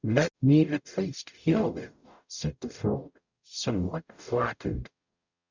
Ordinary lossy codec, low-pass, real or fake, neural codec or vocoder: Opus, 64 kbps; 7.2 kHz; fake; codec, 44.1 kHz, 0.9 kbps, DAC